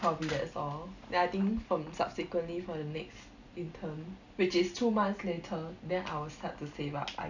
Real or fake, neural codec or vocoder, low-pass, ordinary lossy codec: real; none; 7.2 kHz; none